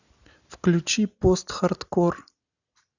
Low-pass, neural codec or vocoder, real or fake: 7.2 kHz; none; real